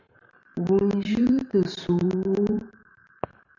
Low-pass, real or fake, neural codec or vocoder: 7.2 kHz; fake; vocoder, 44.1 kHz, 128 mel bands every 512 samples, BigVGAN v2